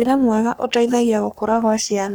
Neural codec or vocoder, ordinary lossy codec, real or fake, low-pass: codec, 44.1 kHz, 3.4 kbps, Pupu-Codec; none; fake; none